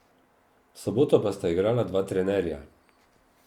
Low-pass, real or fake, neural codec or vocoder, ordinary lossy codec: 19.8 kHz; real; none; Opus, 64 kbps